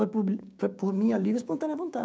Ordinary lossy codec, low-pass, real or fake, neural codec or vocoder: none; none; fake; codec, 16 kHz, 6 kbps, DAC